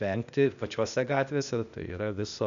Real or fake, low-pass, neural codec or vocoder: fake; 7.2 kHz; codec, 16 kHz, 0.8 kbps, ZipCodec